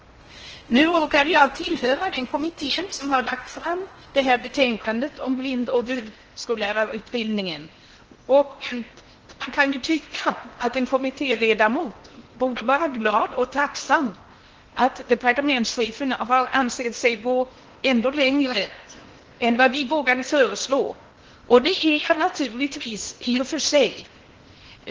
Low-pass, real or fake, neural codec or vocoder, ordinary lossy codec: 7.2 kHz; fake; codec, 16 kHz in and 24 kHz out, 0.8 kbps, FocalCodec, streaming, 65536 codes; Opus, 16 kbps